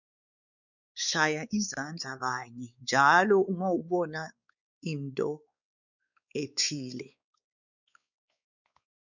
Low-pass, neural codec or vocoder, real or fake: 7.2 kHz; codec, 16 kHz, 4 kbps, X-Codec, WavLM features, trained on Multilingual LibriSpeech; fake